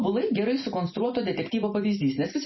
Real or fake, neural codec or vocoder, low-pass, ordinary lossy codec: real; none; 7.2 kHz; MP3, 24 kbps